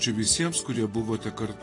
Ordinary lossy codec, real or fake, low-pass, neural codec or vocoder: AAC, 32 kbps; real; 10.8 kHz; none